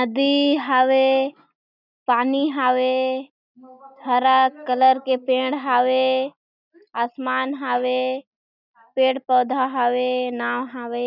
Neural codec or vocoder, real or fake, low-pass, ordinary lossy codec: none; real; 5.4 kHz; none